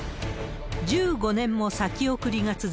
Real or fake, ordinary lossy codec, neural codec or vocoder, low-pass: real; none; none; none